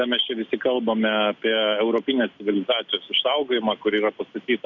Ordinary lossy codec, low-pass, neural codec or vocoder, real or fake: MP3, 64 kbps; 7.2 kHz; none; real